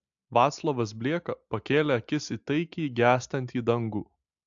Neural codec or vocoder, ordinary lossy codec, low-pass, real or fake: none; AAC, 64 kbps; 7.2 kHz; real